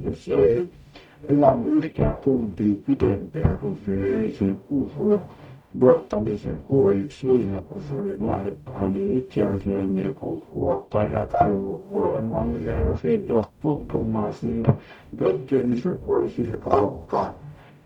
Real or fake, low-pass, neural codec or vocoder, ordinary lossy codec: fake; 19.8 kHz; codec, 44.1 kHz, 0.9 kbps, DAC; none